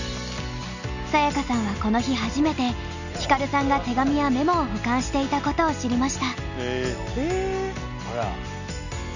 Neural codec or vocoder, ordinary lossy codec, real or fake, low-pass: none; none; real; 7.2 kHz